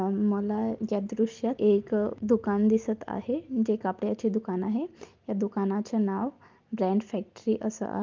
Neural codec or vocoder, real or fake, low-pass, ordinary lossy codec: none; real; 7.2 kHz; Opus, 32 kbps